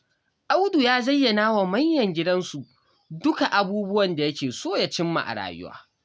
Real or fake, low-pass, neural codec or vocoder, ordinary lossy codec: real; none; none; none